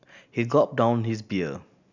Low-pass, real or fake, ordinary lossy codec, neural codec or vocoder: 7.2 kHz; real; none; none